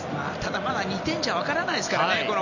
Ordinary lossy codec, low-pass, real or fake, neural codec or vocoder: none; 7.2 kHz; real; none